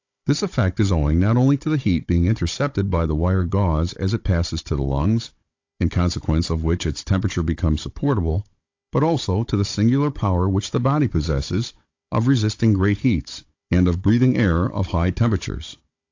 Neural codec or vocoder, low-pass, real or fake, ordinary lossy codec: codec, 16 kHz, 16 kbps, FunCodec, trained on Chinese and English, 50 frames a second; 7.2 kHz; fake; AAC, 48 kbps